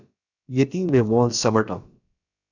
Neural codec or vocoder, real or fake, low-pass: codec, 16 kHz, about 1 kbps, DyCAST, with the encoder's durations; fake; 7.2 kHz